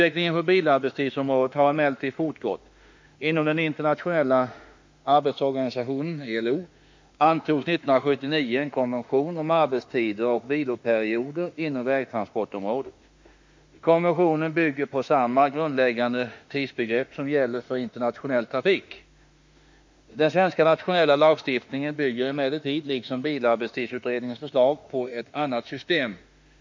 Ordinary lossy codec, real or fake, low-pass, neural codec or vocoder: MP3, 48 kbps; fake; 7.2 kHz; autoencoder, 48 kHz, 32 numbers a frame, DAC-VAE, trained on Japanese speech